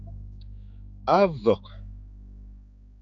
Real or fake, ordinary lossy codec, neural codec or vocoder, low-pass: fake; MP3, 64 kbps; codec, 16 kHz, 4 kbps, X-Codec, HuBERT features, trained on general audio; 7.2 kHz